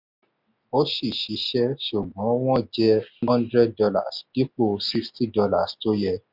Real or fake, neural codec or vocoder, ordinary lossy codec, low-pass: real; none; none; 5.4 kHz